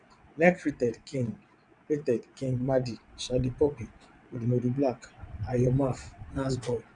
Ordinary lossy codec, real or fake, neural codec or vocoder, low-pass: none; fake; vocoder, 22.05 kHz, 80 mel bands, WaveNeXt; 9.9 kHz